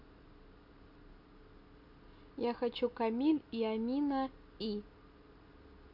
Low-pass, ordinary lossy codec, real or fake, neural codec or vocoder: 5.4 kHz; none; real; none